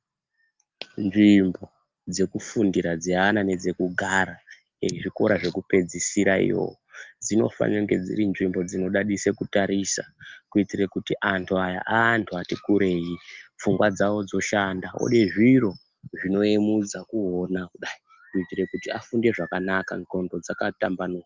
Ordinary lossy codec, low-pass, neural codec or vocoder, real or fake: Opus, 32 kbps; 7.2 kHz; none; real